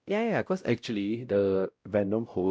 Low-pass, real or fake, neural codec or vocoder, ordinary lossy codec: none; fake; codec, 16 kHz, 0.5 kbps, X-Codec, WavLM features, trained on Multilingual LibriSpeech; none